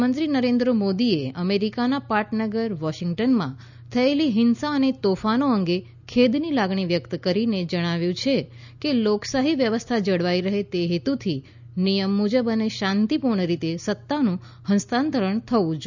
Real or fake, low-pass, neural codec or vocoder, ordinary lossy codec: real; 7.2 kHz; none; none